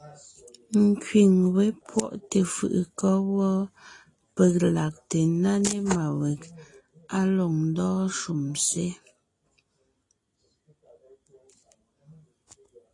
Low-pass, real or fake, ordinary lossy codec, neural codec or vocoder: 10.8 kHz; real; AAC, 32 kbps; none